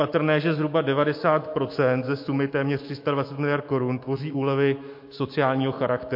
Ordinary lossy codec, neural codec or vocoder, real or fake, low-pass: MP3, 32 kbps; autoencoder, 48 kHz, 128 numbers a frame, DAC-VAE, trained on Japanese speech; fake; 5.4 kHz